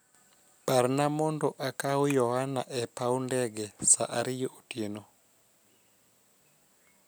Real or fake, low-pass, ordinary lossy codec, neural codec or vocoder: real; none; none; none